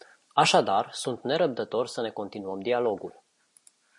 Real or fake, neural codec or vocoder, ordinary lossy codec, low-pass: real; none; MP3, 48 kbps; 10.8 kHz